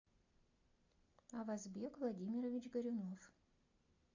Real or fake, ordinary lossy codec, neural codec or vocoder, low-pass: real; AAC, 48 kbps; none; 7.2 kHz